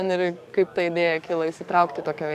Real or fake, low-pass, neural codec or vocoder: fake; 14.4 kHz; codec, 44.1 kHz, 7.8 kbps, DAC